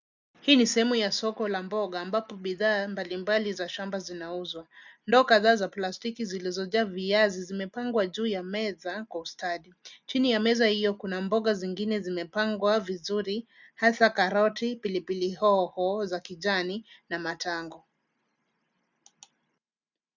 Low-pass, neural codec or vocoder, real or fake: 7.2 kHz; none; real